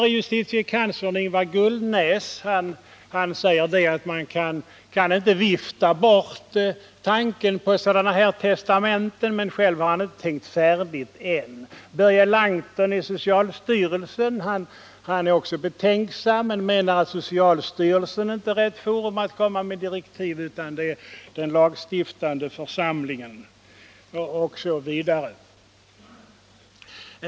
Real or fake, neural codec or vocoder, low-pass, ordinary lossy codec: real; none; none; none